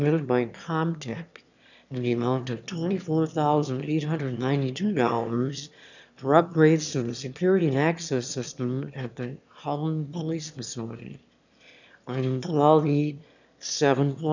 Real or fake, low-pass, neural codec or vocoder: fake; 7.2 kHz; autoencoder, 22.05 kHz, a latent of 192 numbers a frame, VITS, trained on one speaker